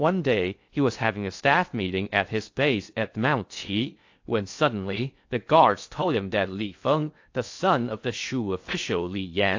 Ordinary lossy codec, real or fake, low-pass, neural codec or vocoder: AAC, 48 kbps; fake; 7.2 kHz; codec, 16 kHz in and 24 kHz out, 0.6 kbps, FocalCodec, streaming, 2048 codes